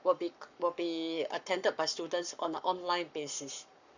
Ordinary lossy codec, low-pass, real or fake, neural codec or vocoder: none; 7.2 kHz; fake; codec, 44.1 kHz, 7.8 kbps, Pupu-Codec